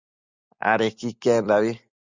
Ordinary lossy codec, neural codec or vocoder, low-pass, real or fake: Opus, 64 kbps; none; 7.2 kHz; real